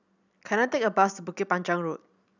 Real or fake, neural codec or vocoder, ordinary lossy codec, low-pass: real; none; none; 7.2 kHz